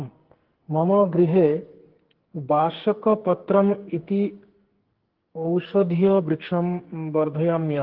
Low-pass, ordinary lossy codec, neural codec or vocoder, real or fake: 5.4 kHz; Opus, 16 kbps; codec, 44.1 kHz, 2.6 kbps, SNAC; fake